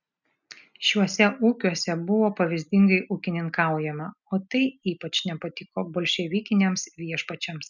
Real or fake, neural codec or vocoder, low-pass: real; none; 7.2 kHz